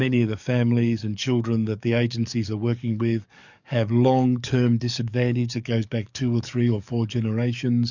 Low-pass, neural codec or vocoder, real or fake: 7.2 kHz; codec, 44.1 kHz, 7.8 kbps, DAC; fake